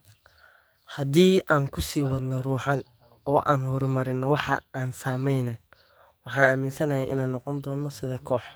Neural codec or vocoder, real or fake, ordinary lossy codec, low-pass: codec, 44.1 kHz, 2.6 kbps, SNAC; fake; none; none